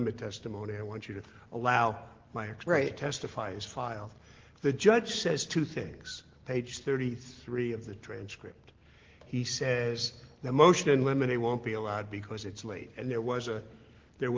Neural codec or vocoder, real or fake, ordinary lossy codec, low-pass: none; real; Opus, 16 kbps; 7.2 kHz